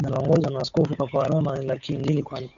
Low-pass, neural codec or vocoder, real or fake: 7.2 kHz; codec, 16 kHz, 8 kbps, FunCodec, trained on Chinese and English, 25 frames a second; fake